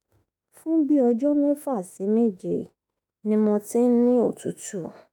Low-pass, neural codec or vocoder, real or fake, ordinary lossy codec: none; autoencoder, 48 kHz, 32 numbers a frame, DAC-VAE, trained on Japanese speech; fake; none